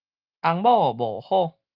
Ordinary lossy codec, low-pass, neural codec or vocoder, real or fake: Opus, 24 kbps; 5.4 kHz; none; real